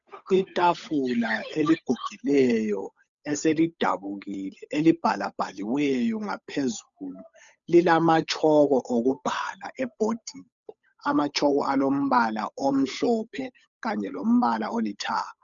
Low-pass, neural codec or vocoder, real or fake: 7.2 kHz; codec, 16 kHz, 8 kbps, FunCodec, trained on Chinese and English, 25 frames a second; fake